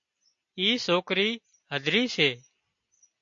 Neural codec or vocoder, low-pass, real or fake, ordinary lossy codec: none; 7.2 kHz; real; MP3, 48 kbps